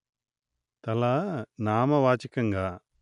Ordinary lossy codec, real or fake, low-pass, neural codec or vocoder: none; real; 10.8 kHz; none